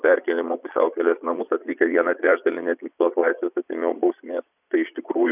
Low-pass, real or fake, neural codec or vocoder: 3.6 kHz; fake; vocoder, 22.05 kHz, 80 mel bands, Vocos